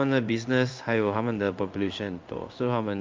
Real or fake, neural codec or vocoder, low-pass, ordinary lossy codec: fake; codec, 16 kHz in and 24 kHz out, 1 kbps, XY-Tokenizer; 7.2 kHz; Opus, 24 kbps